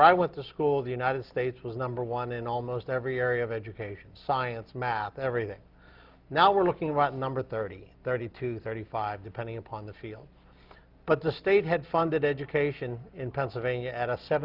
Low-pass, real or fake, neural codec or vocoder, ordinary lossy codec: 5.4 kHz; real; none; Opus, 24 kbps